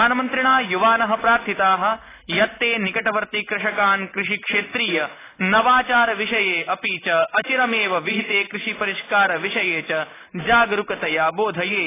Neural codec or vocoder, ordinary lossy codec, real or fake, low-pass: none; AAC, 16 kbps; real; 3.6 kHz